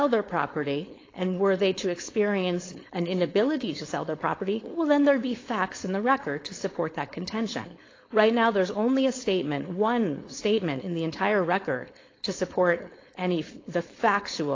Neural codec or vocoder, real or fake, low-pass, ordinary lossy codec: codec, 16 kHz, 4.8 kbps, FACodec; fake; 7.2 kHz; AAC, 32 kbps